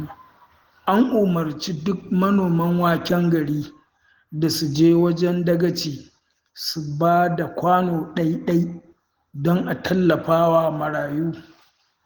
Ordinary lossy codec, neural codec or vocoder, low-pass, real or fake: Opus, 16 kbps; none; 19.8 kHz; real